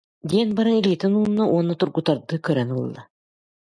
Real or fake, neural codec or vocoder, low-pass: real; none; 9.9 kHz